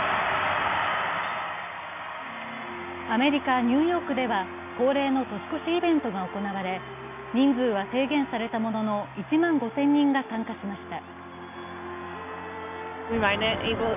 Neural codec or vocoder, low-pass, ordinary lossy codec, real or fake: none; 3.6 kHz; none; real